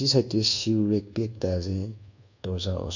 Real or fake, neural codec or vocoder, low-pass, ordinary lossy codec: fake; codec, 24 kHz, 1.2 kbps, DualCodec; 7.2 kHz; none